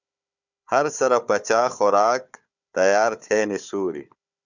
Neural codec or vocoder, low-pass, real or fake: codec, 16 kHz, 4 kbps, FunCodec, trained on Chinese and English, 50 frames a second; 7.2 kHz; fake